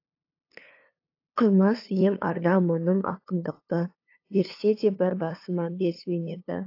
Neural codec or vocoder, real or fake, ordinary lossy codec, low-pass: codec, 16 kHz, 2 kbps, FunCodec, trained on LibriTTS, 25 frames a second; fake; AAC, 32 kbps; 5.4 kHz